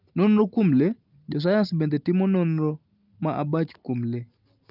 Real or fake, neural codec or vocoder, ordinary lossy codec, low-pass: real; none; Opus, 32 kbps; 5.4 kHz